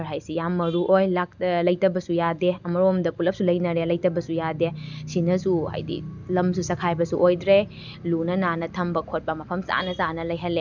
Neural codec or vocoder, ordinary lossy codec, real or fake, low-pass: none; none; real; 7.2 kHz